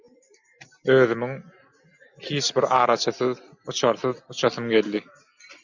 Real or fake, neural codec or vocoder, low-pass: real; none; 7.2 kHz